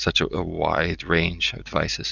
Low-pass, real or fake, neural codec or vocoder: 7.2 kHz; real; none